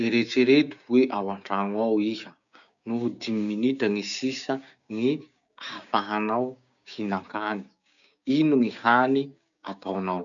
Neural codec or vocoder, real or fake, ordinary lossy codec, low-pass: codec, 16 kHz, 6 kbps, DAC; fake; none; 7.2 kHz